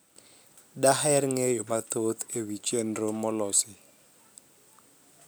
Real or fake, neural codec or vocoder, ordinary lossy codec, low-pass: real; none; none; none